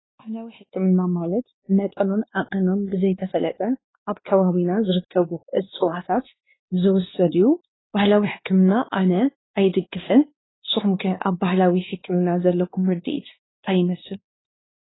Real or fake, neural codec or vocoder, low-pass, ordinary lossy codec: fake; codec, 16 kHz, 2 kbps, X-Codec, WavLM features, trained on Multilingual LibriSpeech; 7.2 kHz; AAC, 16 kbps